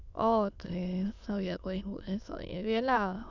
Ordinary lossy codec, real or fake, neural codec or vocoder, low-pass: none; fake; autoencoder, 22.05 kHz, a latent of 192 numbers a frame, VITS, trained on many speakers; 7.2 kHz